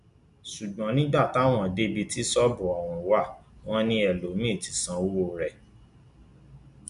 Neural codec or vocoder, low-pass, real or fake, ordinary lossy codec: none; 10.8 kHz; real; none